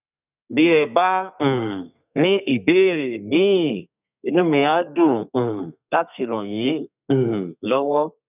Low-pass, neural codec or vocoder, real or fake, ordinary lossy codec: 3.6 kHz; codec, 32 kHz, 1.9 kbps, SNAC; fake; none